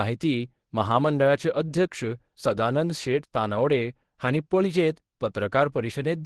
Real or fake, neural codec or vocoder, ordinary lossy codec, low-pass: fake; codec, 24 kHz, 0.9 kbps, WavTokenizer, medium speech release version 1; Opus, 16 kbps; 10.8 kHz